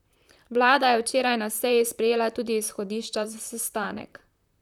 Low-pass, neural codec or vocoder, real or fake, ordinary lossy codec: 19.8 kHz; vocoder, 44.1 kHz, 128 mel bands, Pupu-Vocoder; fake; none